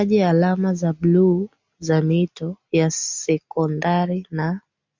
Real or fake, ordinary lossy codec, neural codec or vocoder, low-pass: real; MP3, 64 kbps; none; 7.2 kHz